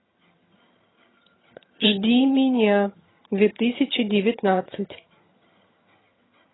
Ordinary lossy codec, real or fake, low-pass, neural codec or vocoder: AAC, 16 kbps; fake; 7.2 kHz; vocoder, 22.05 kHz, 80 mel bands, HiFi-GAN